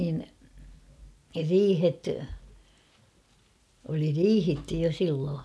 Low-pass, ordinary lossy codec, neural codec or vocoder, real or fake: none; none; none; real